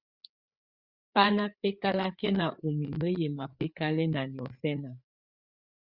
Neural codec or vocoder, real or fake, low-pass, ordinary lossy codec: codec, 16 kHz, 4 kbps, FreqCodec, larger model; fake; 5.4 kHz; Opus, 64 kbps